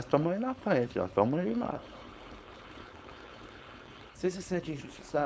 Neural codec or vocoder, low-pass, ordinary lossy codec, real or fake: codec, 16 kHz, 4.8 kbps, FACodec; none; none; fake